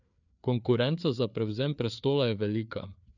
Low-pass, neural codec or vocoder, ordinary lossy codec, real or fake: 7.2 kHz; codec, 16 kHz, 4 kbps, FreqCodec, larger model; none; fake